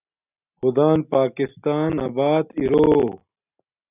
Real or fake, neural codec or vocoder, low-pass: real; none; 3.6 kHz